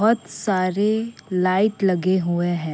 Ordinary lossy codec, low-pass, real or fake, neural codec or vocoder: none; none; real; none